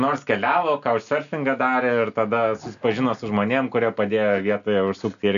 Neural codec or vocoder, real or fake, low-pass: none; real; 7.2 kHz